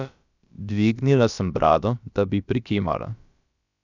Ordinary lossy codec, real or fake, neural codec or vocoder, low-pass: none; fake; codec, 16 kHz, about 1 kbps, DyCAST, with the encoder's durations; 7.2 kHz